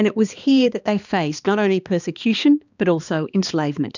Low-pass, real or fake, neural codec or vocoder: 7.2 kHz; fake; codec, 16 kHz, 2 kbps, X-Codec, HuBERT features, trained on balanced general audio